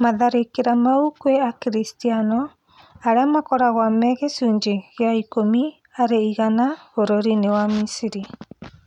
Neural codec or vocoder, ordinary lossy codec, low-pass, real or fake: none; none; 19.8 kHz; real